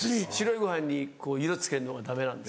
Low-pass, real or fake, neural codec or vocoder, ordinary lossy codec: none; real; none; none